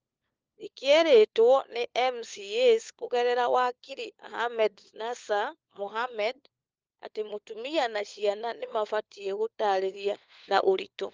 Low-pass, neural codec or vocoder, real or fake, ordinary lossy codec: 7.2 kHz; codec, 16 kHz, 4 kbps, FunCodec, trained on LibriTTS, 50 frames a second; fake; Opus, 24 kbps